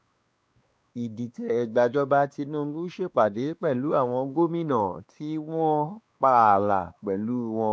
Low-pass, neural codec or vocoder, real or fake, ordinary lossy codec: none; codec, 16 kHz, 2 kbps, X-Codec, WavLM features, trained on Multilingual LibriSpeech; fake; none